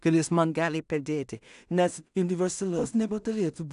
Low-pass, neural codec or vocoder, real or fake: 10.8 kHz; codec, 16 kHz in and 24 kHz out, 0.4 kbps, LongCat-Audio-Codec, two codebook decoder; fake